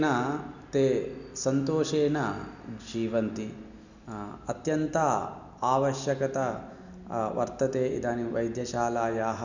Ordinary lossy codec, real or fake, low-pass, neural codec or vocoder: none; real; 7.2 kHz; none